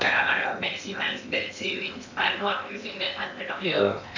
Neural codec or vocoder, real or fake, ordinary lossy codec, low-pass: codec, 16 kHz in and 24 kHz out, 0.8 kbps, FocalCodec, streaming, 65536 codes; fake; none; 7.2 kHz